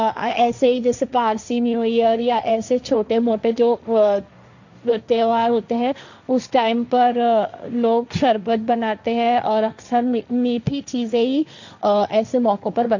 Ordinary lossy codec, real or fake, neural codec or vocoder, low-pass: none; fake; codec, 16 kHz, 1.1 kbps, Voila-Tokenizer; 7.2 kHz